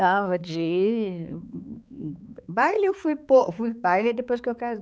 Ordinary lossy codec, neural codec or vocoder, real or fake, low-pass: none; codec, 16 kHz, 4 kbps, X-Codec, HuBERT features, trained on balanced general audio; fake; none